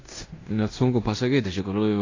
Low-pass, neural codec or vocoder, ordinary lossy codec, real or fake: 7.2 kHz; codec, 16 kHz in and 24 kHz out, 0.9 kbps, LongCat-Audio-Codec, fine tuned four codebook decoder; AAC, 32 kbps; fake